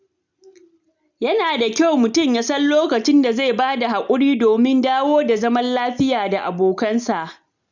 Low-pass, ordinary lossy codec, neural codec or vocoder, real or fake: 7.2 kHz; none; none; real